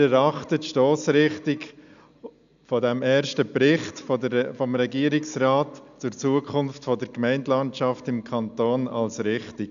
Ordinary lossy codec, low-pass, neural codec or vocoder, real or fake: none; 7.2 kHz; none; real